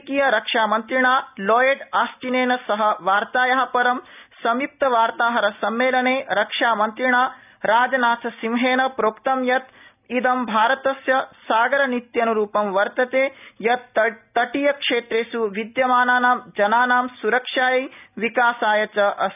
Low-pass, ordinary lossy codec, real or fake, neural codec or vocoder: 3.6 kHz; none; real; none